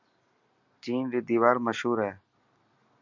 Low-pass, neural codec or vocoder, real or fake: 7.2 kHz; none; real